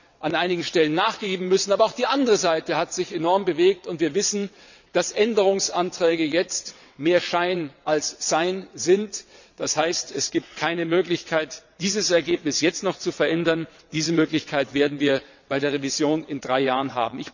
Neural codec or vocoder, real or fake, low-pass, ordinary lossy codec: vocoder, 22.05 kHz, 80 mel bands, WaveNeXt; fake; 7.2 kHz; none